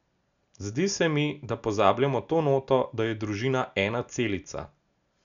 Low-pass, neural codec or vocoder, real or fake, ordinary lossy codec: 7.2 kHz; none; real; none